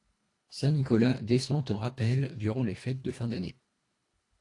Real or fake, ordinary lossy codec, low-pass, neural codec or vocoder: fake; AAC, 48 kbps; 10.8 kHz; codec, 24 kHz, 1.5 kbps, HILCodec